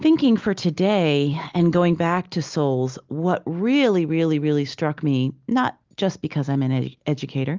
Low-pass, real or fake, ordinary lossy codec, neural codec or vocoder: 7.2 kHz; real; Opus, 32 kbps; none